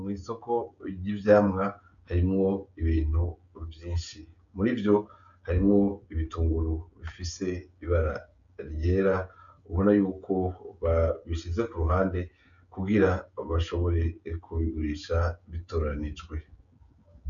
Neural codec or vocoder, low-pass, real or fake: codec, 16 kHz, 16 kbps, FreqCodec, smaller model; 7.2 kHz; fake